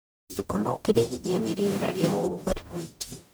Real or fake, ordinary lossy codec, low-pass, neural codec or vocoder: fake; none; none; codec, 44.1 kHz, 0.9 kbps, DAC